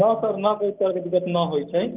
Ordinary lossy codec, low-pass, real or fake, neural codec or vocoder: Opus, 24 kbps; 3.6 kHz; real; none